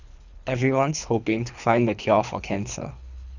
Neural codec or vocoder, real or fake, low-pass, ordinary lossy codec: codec, 24 kHz, 3 kbps, HILCodec; fake; 7.2 kHz; none